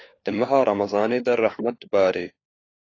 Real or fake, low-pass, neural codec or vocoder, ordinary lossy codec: fake; 7.2 kHz; codec, 16 kHz, 4 kbps, FunCodec, trained on LibriTTS, 50 frames a second; AAC, 32 kbps